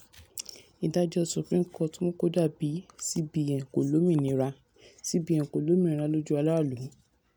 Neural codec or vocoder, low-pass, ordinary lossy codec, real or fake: none; none; none; real